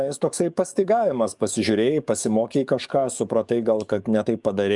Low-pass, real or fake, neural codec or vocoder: 10.8 kHz; fake; codec, 44.1 kHz, 7.8 kbps, DAC